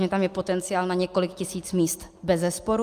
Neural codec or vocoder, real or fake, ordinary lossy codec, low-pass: none; real; Opus, 32 kbps; 14.4 kHz